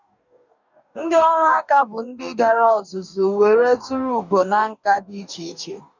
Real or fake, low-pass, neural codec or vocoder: fake; 7.2 kHz; codec, 44.1 kHz, 2.6 kbps, DAC